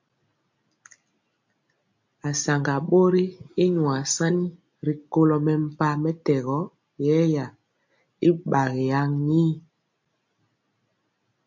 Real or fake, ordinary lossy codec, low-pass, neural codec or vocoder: real; MP3, 64 kbps; 7.2 kHz; none